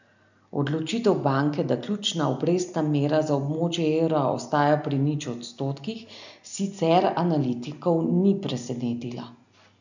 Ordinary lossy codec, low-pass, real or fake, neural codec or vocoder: none; 7.2 kHz; real; none